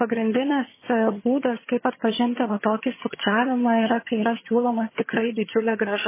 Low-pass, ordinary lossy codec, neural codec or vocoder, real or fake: 3.6 kHz; MP3, 16 kbps; vocoder, 22.05 kHz, 80 mel bands, HiFi-GAN; fake